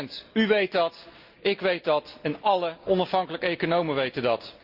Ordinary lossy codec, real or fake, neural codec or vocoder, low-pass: Opus, 24 kbps; real; none; 5.4 kHz